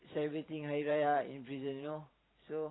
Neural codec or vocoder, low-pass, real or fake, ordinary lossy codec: vocoder, 44.1 kHz, 128 mel bands every 512 samples, BigVGAN v2; 7.2 kHz; fake; AAC, 16 kbps